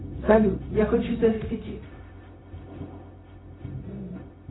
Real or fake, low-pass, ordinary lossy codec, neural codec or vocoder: fake; 7.2 kHz; AAC, 16 kbps; codec, 16 kHz, 0.4 kbps, LongCat-Audio-Codec